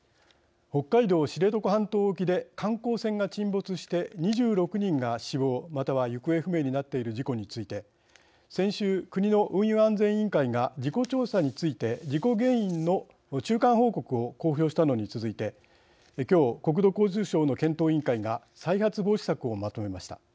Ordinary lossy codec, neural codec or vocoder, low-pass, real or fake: none; none; none; real